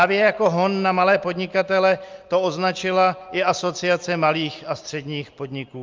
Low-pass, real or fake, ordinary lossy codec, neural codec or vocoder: 7.2 kHz; real; Opus, 24 kbps; none